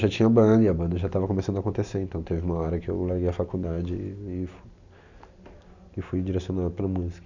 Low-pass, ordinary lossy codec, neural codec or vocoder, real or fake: 7.2 kHz; none; none; real